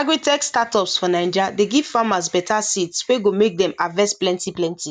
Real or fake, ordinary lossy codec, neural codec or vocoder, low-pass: real; none; none; 14.4 kHz